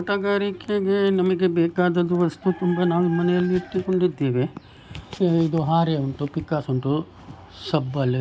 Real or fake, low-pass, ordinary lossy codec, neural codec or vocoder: real; none; none; none